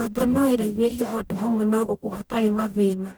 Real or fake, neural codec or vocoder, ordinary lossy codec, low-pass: fake; codec, 44.1 kHz, 0.9 kbps, DAC; none; none